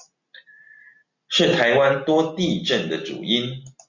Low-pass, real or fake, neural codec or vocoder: 7.2 kHz; real; none